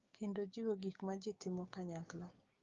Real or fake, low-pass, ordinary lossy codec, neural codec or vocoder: fake; 7.2 kHz; Opus, 32 kbps; codec, 16 kHz, 6 kbps, DAC